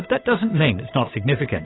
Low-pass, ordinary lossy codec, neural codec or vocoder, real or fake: 7.2 kHz; AAC, 16 kbps; codec, 16 kHz, 8 kbps, FunCodec, trained on Chinese and English, 25 frames a second; fake